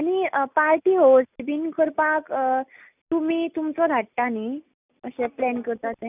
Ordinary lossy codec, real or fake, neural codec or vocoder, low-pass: none; real; none; 3.6 kHz